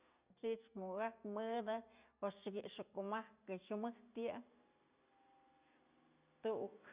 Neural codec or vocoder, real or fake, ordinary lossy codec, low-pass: none; real; Opus, 64 kbps; 3.6 kHz